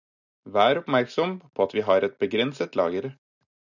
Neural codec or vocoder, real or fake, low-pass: none; real; 7.2 kHz